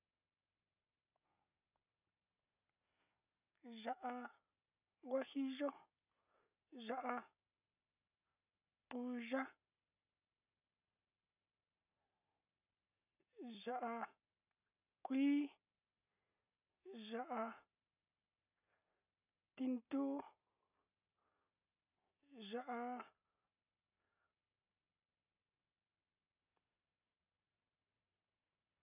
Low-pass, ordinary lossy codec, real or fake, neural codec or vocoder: 3.6 kHz; none; real; none